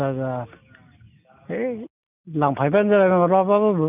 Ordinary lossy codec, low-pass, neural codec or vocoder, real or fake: none; 3.6 kHz; none; real